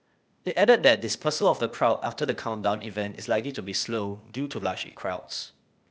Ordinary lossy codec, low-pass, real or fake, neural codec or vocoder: none; none; fake; codec, 16 kHz, 0.8 kbps, ZipCodec